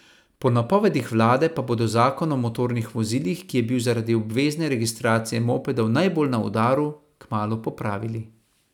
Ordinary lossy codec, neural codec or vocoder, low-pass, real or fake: none; none; 19.8 kHz; real